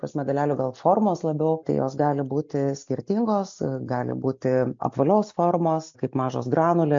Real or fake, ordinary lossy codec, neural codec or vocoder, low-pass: real; MP3, 48 kbps; none; 7.2 kHz